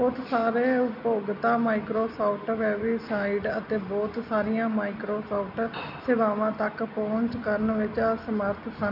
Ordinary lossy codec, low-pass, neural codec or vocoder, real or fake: none; 5.4 kHz; none; real